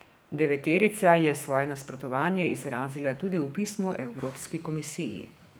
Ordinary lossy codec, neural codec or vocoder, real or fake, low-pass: none; codec, 44.1 kHz, 2.6 kbps, SNAC; fake; none